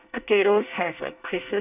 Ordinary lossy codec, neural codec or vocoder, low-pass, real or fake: none; codec, 24 kHz, 1 kbps, SNAC; 3.6 kHz; fake